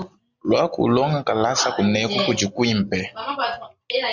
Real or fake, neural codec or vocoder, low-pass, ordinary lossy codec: real; none; 7.2 kHz; Opus, 64 kbps